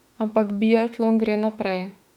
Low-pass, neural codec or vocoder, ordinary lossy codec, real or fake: 19.8 kHz; autoencoder, 48 kHz, 32 numbers a frame, DAC-VAE, trained on Japanese speech; none; fake